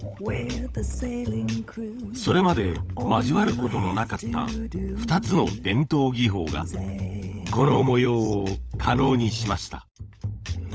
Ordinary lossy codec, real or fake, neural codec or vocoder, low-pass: none; fake; codec, 16 kHz, 16 kbps, FunCodec, trained on LibriTTS, 50 frames a second; none